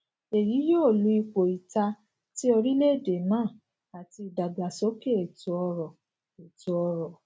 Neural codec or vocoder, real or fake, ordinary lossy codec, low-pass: none; real; none; none